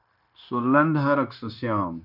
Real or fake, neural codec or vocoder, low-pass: fake; codec, 16 kHz, 0.9 kbps, LongCat-Audio-Codec; 5.4 kHz